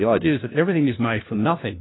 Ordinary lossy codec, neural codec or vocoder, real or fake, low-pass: AAC, 16 kbps; codec, 16 kHz, 1 kbps, FunCodec, trained on LibriTTS, 50 frames a second; fake; 7.2 kHz